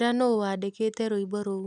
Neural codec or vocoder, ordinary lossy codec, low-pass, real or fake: none; none; 10.8 kHz; real